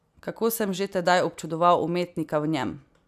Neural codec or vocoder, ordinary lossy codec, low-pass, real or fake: none; none; 14.4 kHz; real